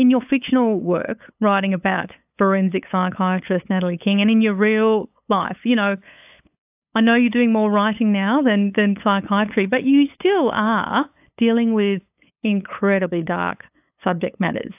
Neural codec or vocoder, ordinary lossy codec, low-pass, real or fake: codec, 16 kHz, 8 kbps, FunCodec, trained on LibriTTS, 25 frames a second; AAC, 32 kbps; 3.6 kHz; fake